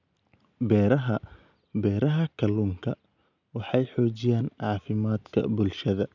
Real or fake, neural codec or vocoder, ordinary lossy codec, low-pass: real; none; none; 7.2 kHz